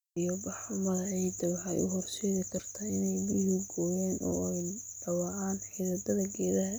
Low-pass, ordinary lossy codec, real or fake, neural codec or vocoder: none; none; real; none